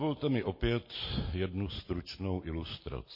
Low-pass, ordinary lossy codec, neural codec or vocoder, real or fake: 5.4 kHz; MP3, 24 kbps; none; real